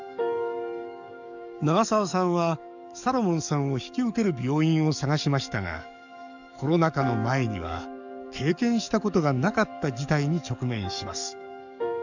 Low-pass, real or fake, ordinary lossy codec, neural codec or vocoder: 7.2 kHz; fake; none; codec, 44.1 kHz, 7.8 kbps, DAC